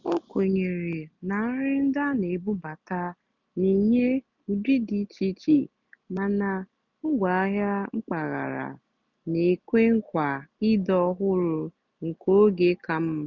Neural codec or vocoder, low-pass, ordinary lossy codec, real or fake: none; 7.2 kHz; none; real